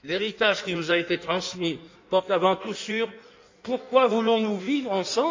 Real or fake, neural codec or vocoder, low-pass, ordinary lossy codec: fake; codec, 16 kHz in and 24 kHz out, 1.1 kbps, FireRedTTS-2 codec; 7.2 kHz; MP3, 64 kbps